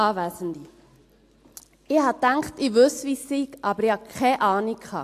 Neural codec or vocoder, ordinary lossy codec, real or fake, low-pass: none; AAC, 64 kbps; real; 14.4 kHz